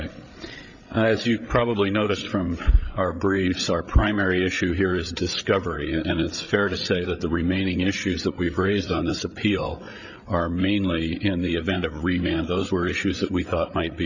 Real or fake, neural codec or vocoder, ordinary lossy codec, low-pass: fake; codec, 16 kHz, 8 kbps, FreqCodec, larger model; Opus, 64 kbps; 7.2 kHz